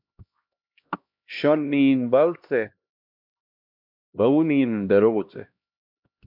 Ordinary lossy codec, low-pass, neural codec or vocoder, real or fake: MP3, 48 kbps; 5.4 kHz; codec, 16 kHz, 1 kbps, X-Codec, HuBERT features, trained on LibriSpeech; fake